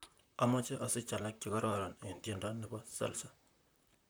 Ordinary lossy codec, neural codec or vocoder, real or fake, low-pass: none; vocoder, 44.1 kHz, 128 mel bands, Pupu-Vocoder; fake; none